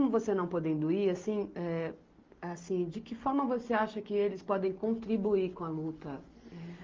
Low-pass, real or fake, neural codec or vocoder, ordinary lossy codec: 7.2 kHz; real; none; Opus, 32 kbps